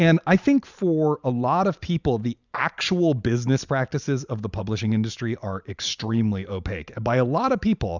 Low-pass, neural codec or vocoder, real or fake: 7.2 kHz; vocoder, 44.1 kHz, 128 mel bands every 512 samples, BigVGAN v2; fake